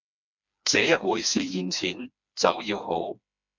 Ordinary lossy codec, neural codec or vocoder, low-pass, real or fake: MP3, 64 kbps; codec, 16 kHz, 2 kbps, FreqCodec, smaller model; 7.2 kHz; fake